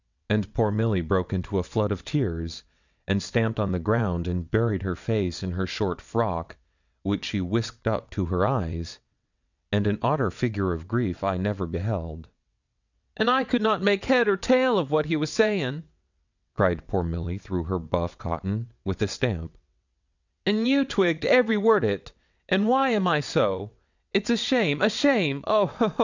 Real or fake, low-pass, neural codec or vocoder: fake; 7.2 kHz; vocoder, 22.05 kHz, 80 mel bands, WaveNeXt